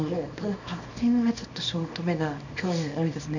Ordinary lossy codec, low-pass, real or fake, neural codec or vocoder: none; 7.2 kHz; fake; codec, 24 kHz, 0.9 kbps, WavTokenizer, small release